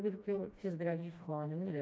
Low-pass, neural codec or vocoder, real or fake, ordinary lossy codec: none; codec, 16 kHz, 1 kbps, FreqCodec, smaller model; fake; none